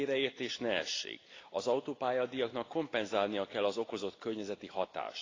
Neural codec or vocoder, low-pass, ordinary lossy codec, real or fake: none; 7.2 kHz; AAC, 32 kbps; real